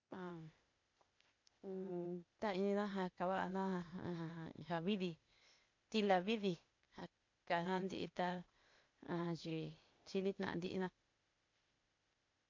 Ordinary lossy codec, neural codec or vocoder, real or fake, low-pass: MP3, 48 kbps; codec, 16 kHz, 0.8 kbps, ZipCodec; fake; 7.2 kHz